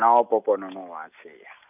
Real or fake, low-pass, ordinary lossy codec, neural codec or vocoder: real; 3.6 kHz; none; none